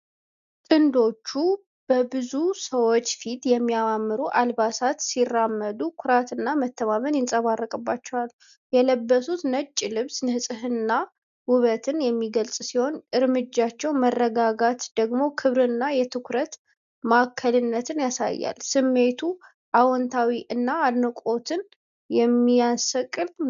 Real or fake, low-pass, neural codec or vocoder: real; 7.2 kHz; none